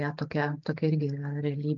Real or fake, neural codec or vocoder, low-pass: real; none; 7.2 kHz